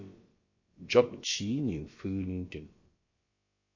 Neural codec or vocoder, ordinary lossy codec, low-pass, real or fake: codec, 16 kHz, about 1 kbps, DyCAST, with the encoder's durations; MP3, 32 kbps; 7.2 kHz; fake